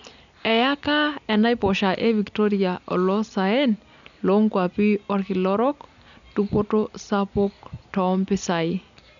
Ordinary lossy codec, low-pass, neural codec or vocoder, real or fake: none; 7.2 kHz; none; real